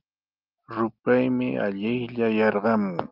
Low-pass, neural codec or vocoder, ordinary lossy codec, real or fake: 5.4 kHz; none; Opus, 32 kbps; real